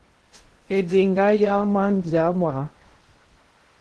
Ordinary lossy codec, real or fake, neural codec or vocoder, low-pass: Opus, 16 kbps; fake; codec, 16 kHz in and 24 kHz out, 0.6 kbps, FocalCodec, streaming, 2048 codes; 10.8 kHz